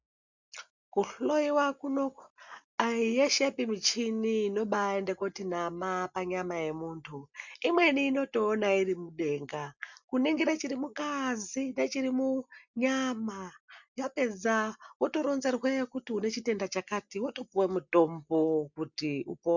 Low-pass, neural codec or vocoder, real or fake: 7.2 kHz; none; real